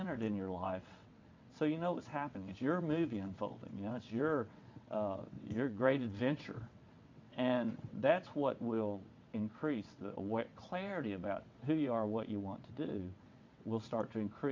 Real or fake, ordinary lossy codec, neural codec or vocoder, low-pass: fake; MP3, 48 kbps; vocoder, 22.05 kHz, 80 mel bands, WaveNeXt; 7.2 kHz